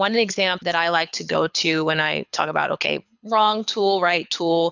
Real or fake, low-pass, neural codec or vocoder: fake; 7.2 kHz; codec, 24 kHz, 6 kbps, HILCodec